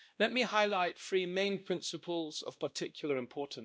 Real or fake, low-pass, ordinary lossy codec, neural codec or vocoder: fake; none; none; codec, 16 kHz, 1 kbps, X-Codec, WavLM features, trained on Multilingual LibriSpeech